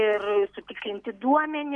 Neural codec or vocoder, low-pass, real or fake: none; 10.8 kHz; real